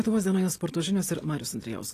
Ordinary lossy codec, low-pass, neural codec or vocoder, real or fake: AAC, 48 kbps; 14.4 kHz; none; real